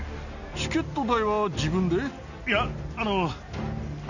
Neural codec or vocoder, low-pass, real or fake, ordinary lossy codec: none; 7.2 kHz; real; none